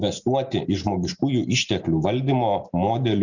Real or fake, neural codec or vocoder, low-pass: real; none; 7.2 kHz